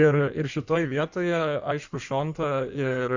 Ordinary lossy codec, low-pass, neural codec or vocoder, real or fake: Opus, 64 kbps; 7.2 kHz; codec, 16 kHz in and 24 kHz out, 1.1 kbps, FireRedTTS-2 codec; fake